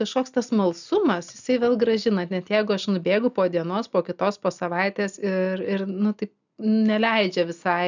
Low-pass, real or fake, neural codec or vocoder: 7.2 kHz; real; none